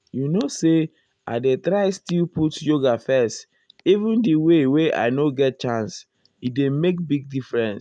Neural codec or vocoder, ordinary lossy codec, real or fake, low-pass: none; none; real; 9.9 kHz